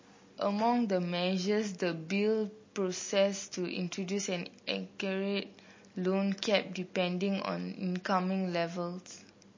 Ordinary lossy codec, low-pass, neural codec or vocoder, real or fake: MP3, 32 kbps; 7.2 kHz; none; real